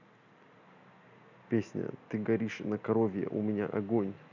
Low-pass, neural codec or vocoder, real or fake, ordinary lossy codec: 7.2 kHz; none; real; none